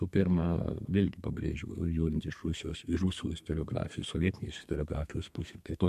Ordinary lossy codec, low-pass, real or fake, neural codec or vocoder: MP3, 64 kbps; 14.4 kHz; fake; codec, 32 kHz, 1.9 kbps, SNAC